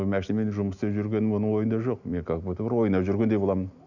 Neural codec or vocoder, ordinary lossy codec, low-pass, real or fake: none; none; 7.2 kHz; real